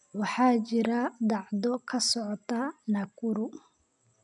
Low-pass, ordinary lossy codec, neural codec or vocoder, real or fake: 10.8 kHz; none; none; real